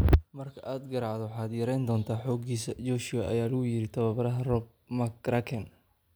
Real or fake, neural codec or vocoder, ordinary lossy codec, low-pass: real; none; none; none